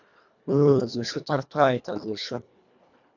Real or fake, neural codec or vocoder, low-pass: fake; codec, 24 kHz, 1.5 kbps, HILCodec; 7.2 kHz